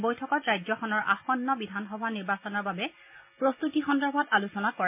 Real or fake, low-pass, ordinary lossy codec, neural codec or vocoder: real; 3.6 kHz; MP3, 32 kbps; none